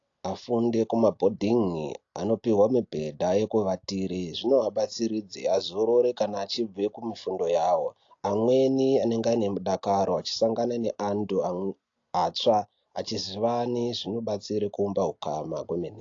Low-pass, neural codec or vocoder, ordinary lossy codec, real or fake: 7.2 kHz; none; AAC, 48 kbps; real